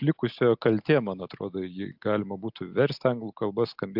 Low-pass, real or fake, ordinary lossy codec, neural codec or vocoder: 5.4 kHz; real; AAC, 48 kbps; none